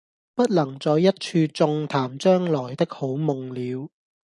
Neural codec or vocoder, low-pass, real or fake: none; 10.8 kHz; real